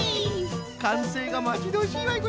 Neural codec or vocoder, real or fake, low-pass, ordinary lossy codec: none; real; none; none